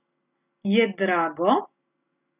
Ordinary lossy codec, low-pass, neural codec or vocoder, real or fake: none; 3.6 kHz; none; real